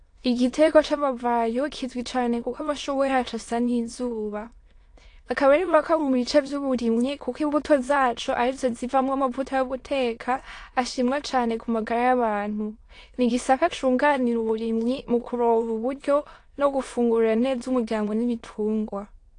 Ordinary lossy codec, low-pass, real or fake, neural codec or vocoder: AAC, 48 kbps; 9.9 kHz; fake; autoencoder, 22.05 kHz, a latent of 192 numbers a frame, VITS, trained on many speakers